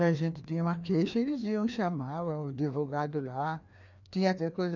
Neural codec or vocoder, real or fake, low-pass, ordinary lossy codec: codec, 16 kHz, 2 kbps, FreqCodec, larger model; fake; 7.2 kHz; none